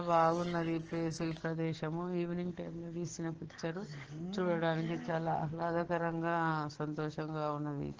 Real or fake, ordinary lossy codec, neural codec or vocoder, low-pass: fake; Opus, 16 kbps; codec, 44.1 kHz, 7.8 kbps, DAC; 7.2 kHz